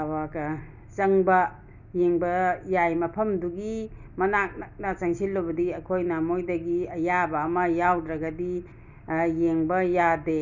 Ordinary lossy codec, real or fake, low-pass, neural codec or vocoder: none; real; 7.2 kHz; none